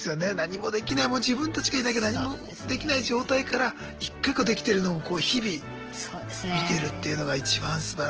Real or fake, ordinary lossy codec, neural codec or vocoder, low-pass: real; Opus, 16 kbps; none; 7.2 kHz